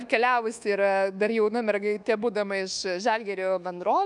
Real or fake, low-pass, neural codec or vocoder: fake; 10.8 kHz; codec, 24 kHz, 1.2 kbps, DualCodec